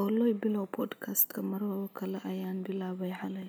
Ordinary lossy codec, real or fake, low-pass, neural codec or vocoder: none; real; none; none